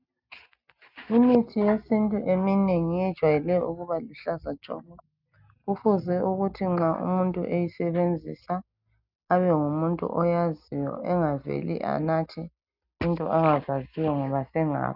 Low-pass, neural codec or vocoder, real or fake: 5.4 kHz; none; real